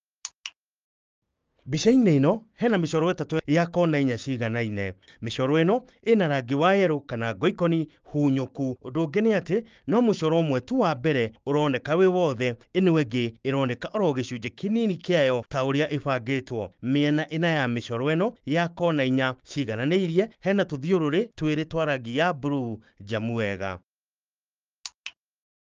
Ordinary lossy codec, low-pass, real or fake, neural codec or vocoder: Opus, 24 kbps; 7.2 kHz; fake; codec, 16 kHz, 6 kbps, DAC